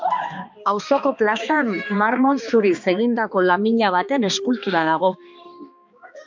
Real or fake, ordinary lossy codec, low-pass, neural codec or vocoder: fake; MP3, 64 kbps; 7.2 kHz; codec, 16 kHz, 2 kbps, X-Codec, HuBERT features, trained on balanced general audio